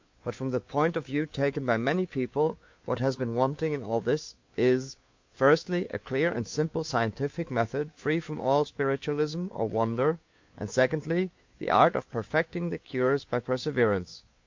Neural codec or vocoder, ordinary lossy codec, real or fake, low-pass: codec, 16 kHz, 2 kbps, FunCodec, trained on Chinese and English, 25 frames a second; MP3, 48 kbps; fake; 7.2 kHz